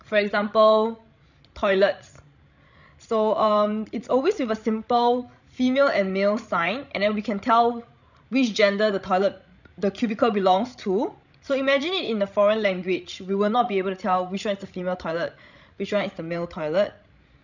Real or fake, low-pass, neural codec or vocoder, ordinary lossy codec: fake; 7.2 kHz; codec, 16 kHz, 16 kbps, FreqCodec, larger model; none